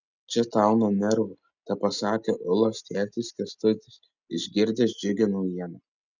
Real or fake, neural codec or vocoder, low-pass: real; none; 7.2 kHz